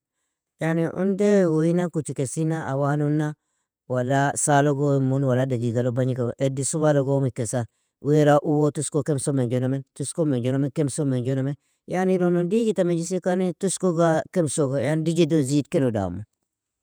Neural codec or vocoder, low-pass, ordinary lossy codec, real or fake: vocoder, 48 kHz, 128 mel bands, Vocos; none; none; fake